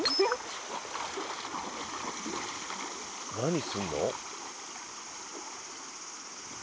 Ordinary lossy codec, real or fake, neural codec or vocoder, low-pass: none; real; none; none